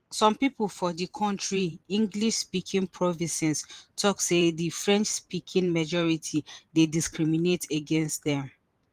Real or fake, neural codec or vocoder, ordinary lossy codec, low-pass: fake; vocoder, 44.1 kHz, 128 mel bands every 512 samples, BigVGAN v2; Opus, 24 kbps; 14.4 kHz